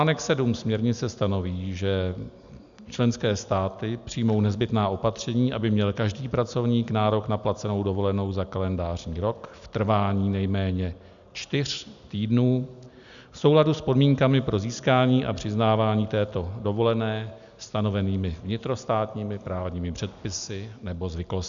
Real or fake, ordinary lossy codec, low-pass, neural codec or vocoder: real; MP3, 96 kbps; 7.2 kHz; none